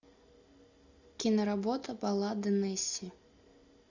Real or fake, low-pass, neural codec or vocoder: real; 7.2 kHz; none